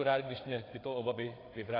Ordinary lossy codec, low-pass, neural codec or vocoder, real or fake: AAC, 24 kbps; 5.4 kHz; codec, 16 kHz, 8 kbps, FreqCodec, larger model; fake